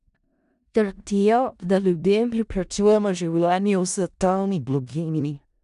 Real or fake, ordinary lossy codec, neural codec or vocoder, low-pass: fake; none; codec, 16 kHz in and 24 kHz out, 0.4 kbps, LongCat-Audio-Codec, four codebook decoder; 10.8 kHz